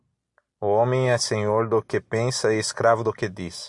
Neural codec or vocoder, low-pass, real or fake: none; 10.8 kHz; real